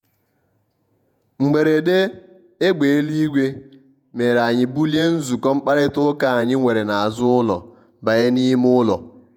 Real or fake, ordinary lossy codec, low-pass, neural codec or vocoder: fake; none; 19.8 kHz; vocoder, 48 kHz, 128 mel bands, Vocos